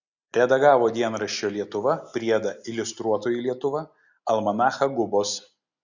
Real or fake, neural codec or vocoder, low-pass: real; none; 7.2 kHz